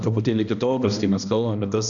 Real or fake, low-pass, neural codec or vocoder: fake; 7.2 kHz; codec, 16 kHz, 1 kbps, X-Codec, HuBERT features, trained on balanced general audio